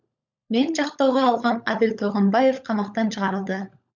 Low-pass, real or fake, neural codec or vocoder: 7.2 kHz; fake; codec, 16 kHz, 16 kbps, FunCodec, trained on LibriTTS, 50 frames a second